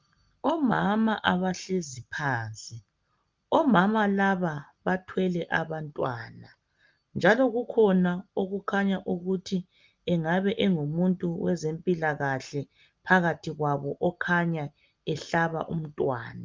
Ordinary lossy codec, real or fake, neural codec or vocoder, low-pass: Opus, 24 kbps; real; none; 7.2 kHz